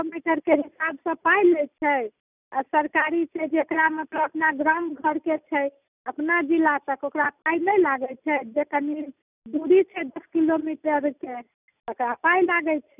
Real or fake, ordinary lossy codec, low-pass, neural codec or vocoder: real; none; 3.6 kHz; none